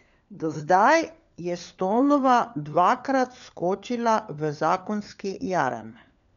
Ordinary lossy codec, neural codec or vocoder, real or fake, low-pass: none; codec, 16 kHz, 4 kbps, FunCodec, trained on LibriTTS, 50 frames a second; fake; 7.2 kHz